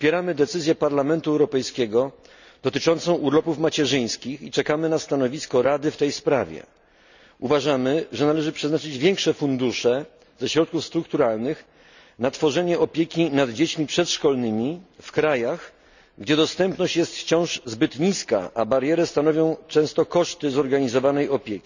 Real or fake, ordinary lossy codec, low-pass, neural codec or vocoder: real; none; 7.2 kHz; none